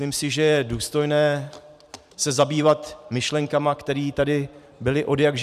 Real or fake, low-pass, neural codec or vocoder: fake; 14.4 kHz; vocoder, 44.1 kHz, 128 mel bands every 512 samples, BigVGAN v2